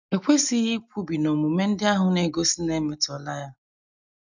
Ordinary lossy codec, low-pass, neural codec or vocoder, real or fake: none; 7.2 kHz; none; real